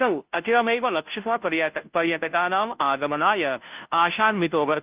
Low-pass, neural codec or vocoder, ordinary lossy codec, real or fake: 3.6 kHz; codec, 16 kHz, 0.5 kbps, FunCodec, trained on Chinese and English, 25 frames a second; Opus, 32 kbps; fake